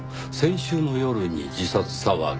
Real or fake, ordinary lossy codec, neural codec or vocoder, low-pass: real; none; none; none